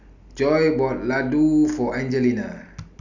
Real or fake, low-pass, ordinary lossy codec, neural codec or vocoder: real; 7.2 kHz; none; none